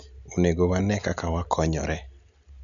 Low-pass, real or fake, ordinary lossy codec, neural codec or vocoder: 7.2 kHz; real; none; none